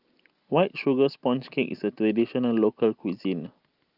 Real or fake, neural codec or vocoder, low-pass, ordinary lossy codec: real; none; 5.4 kHz; Opus, 32 kbps